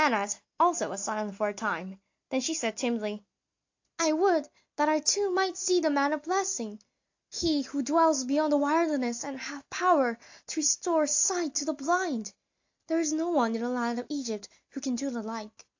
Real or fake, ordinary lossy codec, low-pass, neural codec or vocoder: real; AAC, 48 kbps; 7.2 kHz; none